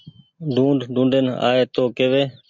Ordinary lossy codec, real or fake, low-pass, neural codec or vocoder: MP3, 64 kbps; real; 7.2 kHz; none